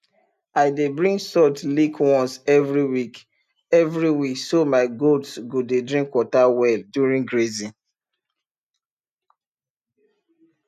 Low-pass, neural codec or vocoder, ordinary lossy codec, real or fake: 14.4 kHz; none; none; real